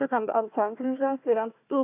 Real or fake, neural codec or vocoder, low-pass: fake; codec, 16 kHz, 1 kbps, FunCodec, trained on Chinese and English, 50 frames a second; 3.6 kHz